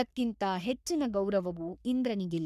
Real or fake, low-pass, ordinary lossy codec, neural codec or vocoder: fake; 14.4 kHz; none; codec, 44.1 kHz, 3.4 kbps, Pupu-Codec